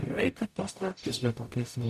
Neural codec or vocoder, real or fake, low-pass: codec, 44.1 kHz, 0.9 kbps, DAC; fake; 14.4 kHz